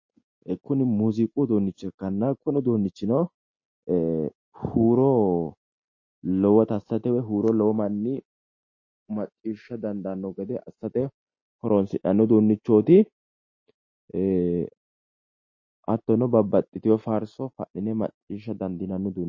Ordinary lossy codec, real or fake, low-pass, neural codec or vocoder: MP3, 32 kbps; real; 7.2 kHz; none